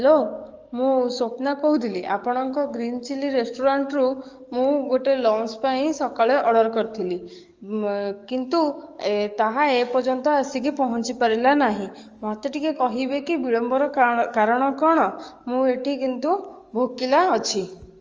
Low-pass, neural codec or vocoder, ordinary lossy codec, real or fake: 7.2 kHz; codec, 44.1 kHz, 7.8 kbps, DAC; Opus, 32 kbps; fake